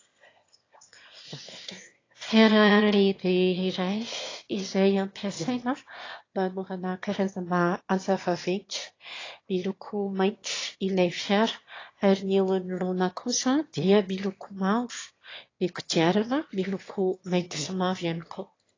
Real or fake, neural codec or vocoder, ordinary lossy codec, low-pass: fake; autoencoder, 22.05 kHz, a latent of 192 numbers a frame, VITS, trained on one speaker; AAC, 32 kbps; 7.2 kHz